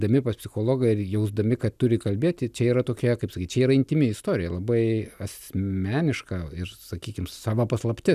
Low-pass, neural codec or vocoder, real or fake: 14.4 kHz; none; real